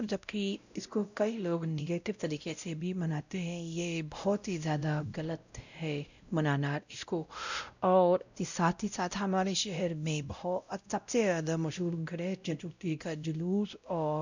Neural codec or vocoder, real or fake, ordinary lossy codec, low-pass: codec, 16 kHz, 0.5 kbps, X-Codec, WavLM features, trained on Multilingual LibriSpeech; fake; none; 7.2 kHz